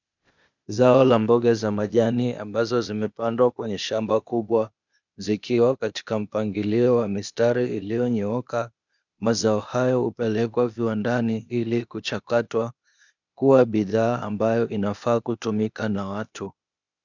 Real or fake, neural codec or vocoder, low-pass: fake; codec, 16 kHz, 0.8 kbps, ZipCodec; 7.2 kHz